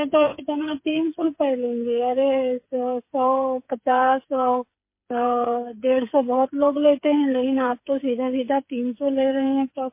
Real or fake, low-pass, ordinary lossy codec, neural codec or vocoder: fake; 3.6 kHz; MP3, 24 kbps; codec, 16 kHz, 8 kbps, FreqCodec, smaller model